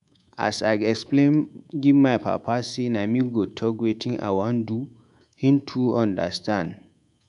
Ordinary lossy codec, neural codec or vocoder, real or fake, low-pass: none; codec, 24 kHz, 3.1 kbps, DualCodec; fake; 10.8 kHz